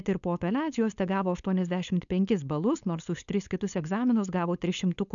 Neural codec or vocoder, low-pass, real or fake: codec, 16 kHz, 4.8 kbps, FACodec; 7.2 kHz; fake